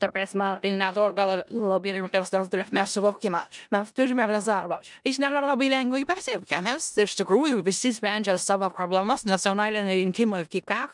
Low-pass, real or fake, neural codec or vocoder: 10.8 kHz; fake; codec, 16 kHz in and 24 kHz out, 0.4 kbps, LongCat-Audio-Codec, four codebook decoder